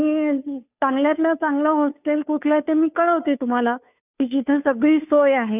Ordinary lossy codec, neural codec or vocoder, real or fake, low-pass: none; codec, 16 kHz, 2 kbps, FunCodec, trained on Chinese and English, 25 frames a second; fake; 3.6 kHz